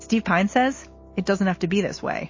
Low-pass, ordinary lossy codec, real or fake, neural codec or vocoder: 7.2 kHz; MP3, 32 kbps; real; none